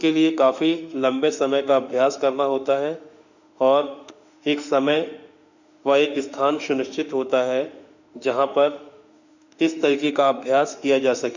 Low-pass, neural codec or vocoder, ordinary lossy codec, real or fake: 7.2 kHz; autoencoder, 48 kHz, 32 numbers a frame, DAC-VAE, trained on Japanese speech; none; fake